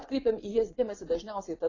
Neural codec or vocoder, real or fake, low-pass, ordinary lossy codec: vocoder, 24 kHz, 100 mel bands, Vocos; fake; 7.2 kHz; AAC, 32 kbps